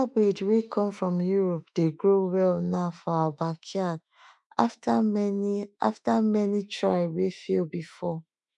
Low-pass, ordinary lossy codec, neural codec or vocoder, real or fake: 10.8 kHz; none; autoencoder, 48 kHz, 32 numbers a frame, DAC-VAE, trained on Japanese speech; fake